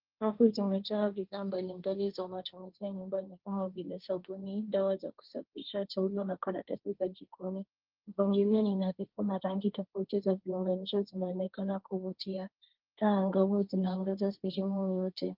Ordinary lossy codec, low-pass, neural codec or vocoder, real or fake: Opus, 32 kbps; 5.4 kHz; codec, 16 kHz, 1.1 kbps, Voila-Tokenizer; fake